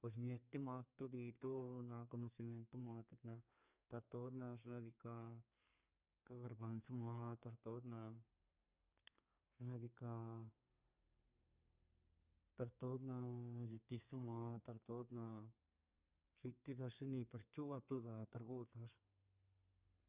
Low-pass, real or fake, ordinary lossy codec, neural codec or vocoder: 3.6 kHz; fake; Opus, 64 kbps; codec, 32 kHz, 1.9 kbps, SNAC